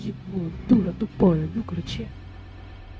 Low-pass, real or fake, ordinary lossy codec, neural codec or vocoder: none; fake; none; codec, 16 kHz, 0.4 kbps, LongCat-Audio-Codec